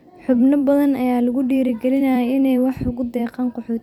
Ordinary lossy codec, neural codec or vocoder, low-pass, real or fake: none; vocoder, 44.1 kHz, 128 mel bands every 256 samples, BigVGAN v2; 19.8 kHz; fake